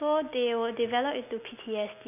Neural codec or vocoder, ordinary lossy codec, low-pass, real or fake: none; none; 3.6 kHz; real